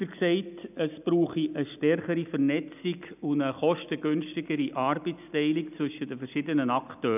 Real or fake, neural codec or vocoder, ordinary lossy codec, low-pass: real; none; none; 3.6 kHz